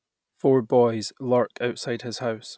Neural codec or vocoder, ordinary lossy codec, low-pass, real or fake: none; none; none; real